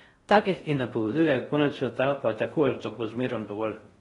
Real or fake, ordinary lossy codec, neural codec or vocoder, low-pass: fake; AAC, 32 kbps; codec, 16 kHz in and 24 kHz out, 0.6 kbps, FocalCodec, streaming, 4096 codes; 10.8 kHz